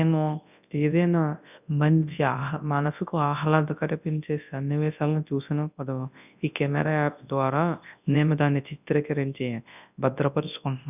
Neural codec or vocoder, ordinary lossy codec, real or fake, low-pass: codec, 24 kHz, 0.9 kbps, WavTokenizer, large speech release; none; fake; 3.6 kHz